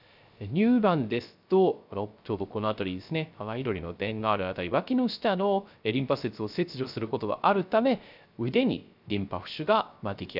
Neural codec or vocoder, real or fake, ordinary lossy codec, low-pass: codec, 16 kHz, 0.3 kbps, FocalCodec; fake; none; 5.4 kHz